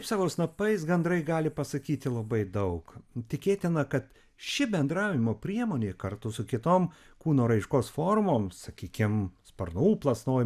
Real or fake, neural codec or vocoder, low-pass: real; none; 14.4 kHz